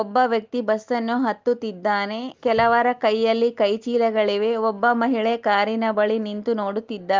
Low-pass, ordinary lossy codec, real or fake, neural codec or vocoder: 7.2 kHz; Opus, 24 kbps; real; none